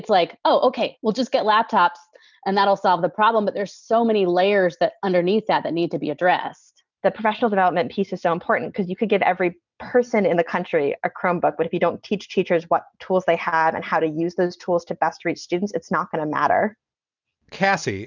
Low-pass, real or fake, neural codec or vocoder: 7.2 kHz; real; none